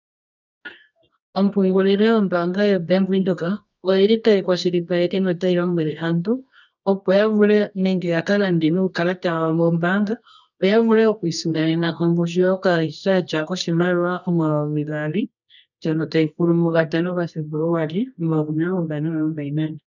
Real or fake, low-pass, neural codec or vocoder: fake; 7.2 kHz; codec, 24 kHz, 0.9 kbps, WavTokenizer, medium music audio release